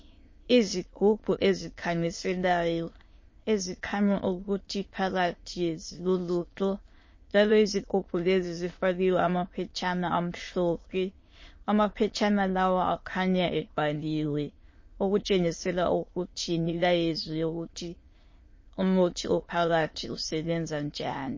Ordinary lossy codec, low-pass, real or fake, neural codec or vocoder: MP3, 32 kbps; 7.2 kHz; fake; autoencoder, 22.05 kHz, a latent of 192 numbers a frame, VITS, trained on many speakers